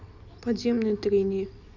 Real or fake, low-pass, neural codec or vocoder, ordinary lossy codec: real; 7.2 kHz; none; none